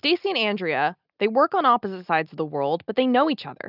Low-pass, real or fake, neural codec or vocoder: 5.4 kHz; real; none